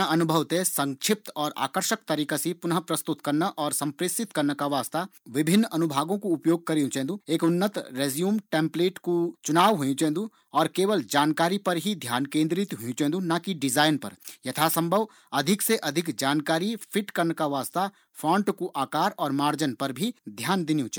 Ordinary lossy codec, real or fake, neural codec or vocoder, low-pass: none; real; none; none